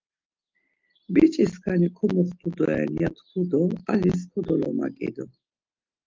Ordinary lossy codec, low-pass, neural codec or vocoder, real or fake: Opus, 32 kbps; 7.2 kHz; none; real